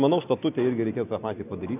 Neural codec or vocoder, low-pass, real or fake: none; 3.6 kHz; real